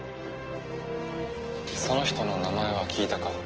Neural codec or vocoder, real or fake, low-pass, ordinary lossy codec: none; real; 7.2 kHz; Opus, 16 kbps